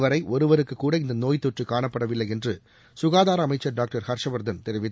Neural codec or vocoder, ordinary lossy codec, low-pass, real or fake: none; none; none; real